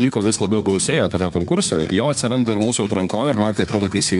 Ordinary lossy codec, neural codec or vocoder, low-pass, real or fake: MP3, 96 kbps; codec, 24 kHz, 1 kbps, SNAC; 10.8 kHz; fake